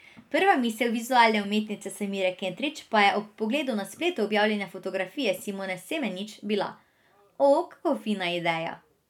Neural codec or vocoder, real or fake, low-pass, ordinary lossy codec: none; real; 19.8 kHz; none